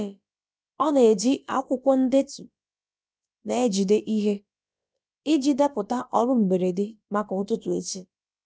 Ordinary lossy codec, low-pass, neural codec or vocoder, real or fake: none; none; codec, 16 kHz, about 1 kbps, DyCAST, with the encoder's durations; fake